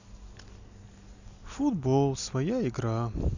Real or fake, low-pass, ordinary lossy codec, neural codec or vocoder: real; 7.2 kHz; none; none